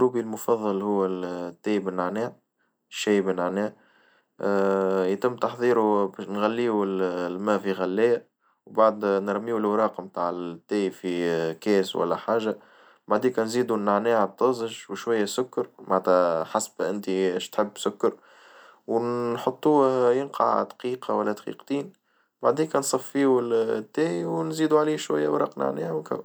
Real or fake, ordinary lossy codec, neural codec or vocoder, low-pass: real; none; none; none